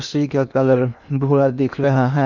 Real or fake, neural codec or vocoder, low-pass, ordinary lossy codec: fake; codec, 16 kHz in and 24 kHz out, 0.8 kbps, FocalCodec, streaming, 65536 codes; 7.2 kHz; none